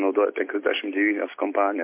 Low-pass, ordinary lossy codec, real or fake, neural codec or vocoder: 3.6 kHz; MP3, 24 kbps; real; none